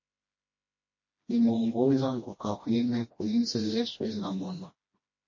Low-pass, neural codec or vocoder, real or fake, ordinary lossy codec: 7.2 kHz; codec, 16 kHz, 1 kbps, FreqCodec, smaller model; fake; MP3, 32 kbps